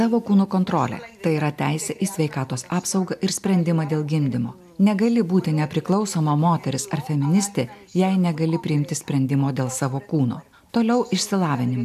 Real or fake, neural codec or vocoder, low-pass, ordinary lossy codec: real; none; 14.4 kHz; AAC, 96 kbps